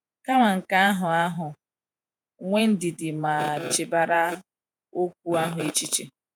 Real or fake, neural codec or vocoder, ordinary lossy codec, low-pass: fake; vocoder, 48 kHz, 128 mel bands, Vocos; none; none